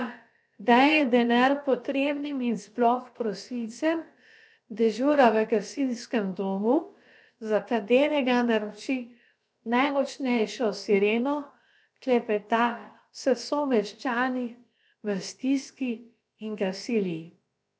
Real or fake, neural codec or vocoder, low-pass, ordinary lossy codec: fake; codec, 16 kHz, about 1 kbps, DyCAST, with the encoder's durations; none; none